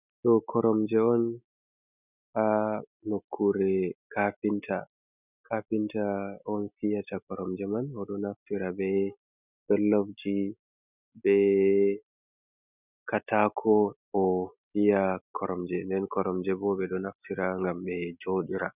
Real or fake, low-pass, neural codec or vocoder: real; 3.6 kHz; none